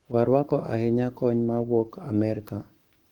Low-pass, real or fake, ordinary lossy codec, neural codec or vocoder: 19.8 kHz; fake; Opus, 24 kbps; codec, 44.1 kHz, 7.8 kbps, Pupu-Codec